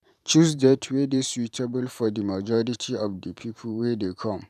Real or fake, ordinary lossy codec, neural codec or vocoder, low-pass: fake; none; vocoder, 44.1 kHz, 128 mel bands every 512 samples, BigVGAN v2; 14.4 kHz